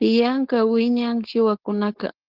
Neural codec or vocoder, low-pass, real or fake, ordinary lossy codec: codec, 16 kHz, 4.8 kbps, FACodec; 5.4 kHz; fake; Opus, 16 kbps